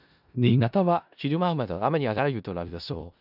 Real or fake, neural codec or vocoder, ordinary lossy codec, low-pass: fake; codec, 16 kHz in and 24 kHz out, 0.4 kbps, LongCat-Audio-Codec, four codebook decoder; none; 5.4 kHz